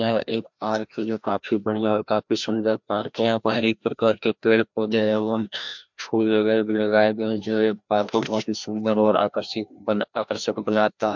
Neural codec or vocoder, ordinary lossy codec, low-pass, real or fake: codec, 16 kHz, 1 kbps, FreqCodec, larger model; MP3, 64 kbps; 7.2 kHz; fake